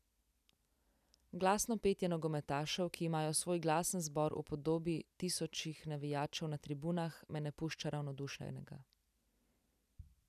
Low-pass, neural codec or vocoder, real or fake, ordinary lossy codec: 14.4 kHz; none; real; none